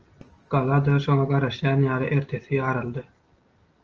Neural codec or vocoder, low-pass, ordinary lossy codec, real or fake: none; 7.2 kHz; Opus, 24 kbps; real